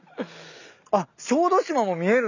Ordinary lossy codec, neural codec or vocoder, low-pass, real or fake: none; none; 7.2 kHz; real